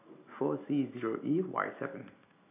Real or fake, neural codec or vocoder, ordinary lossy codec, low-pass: real; none; none; 3.6 kHz